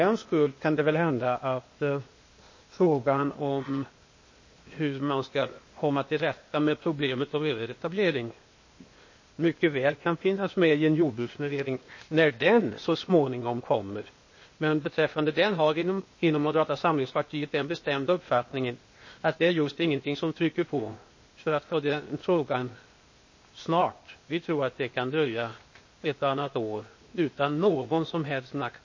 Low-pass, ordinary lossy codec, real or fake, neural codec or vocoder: 7.2 kHz; MP3, 32 kbps; fake; codec, 16 kHz, 0.8 kbps, ZipCodec